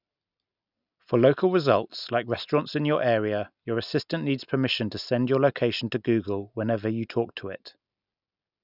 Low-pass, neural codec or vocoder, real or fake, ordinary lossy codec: 5.4 kHz; none; real; none